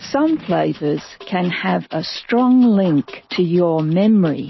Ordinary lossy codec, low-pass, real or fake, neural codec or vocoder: MP3, 24 kbps; 7.2 kHz; real; none